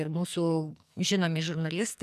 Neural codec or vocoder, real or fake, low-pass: codec, 44.1 kHz, 2.6 kbps, SNAC; fake; 14.4 kHz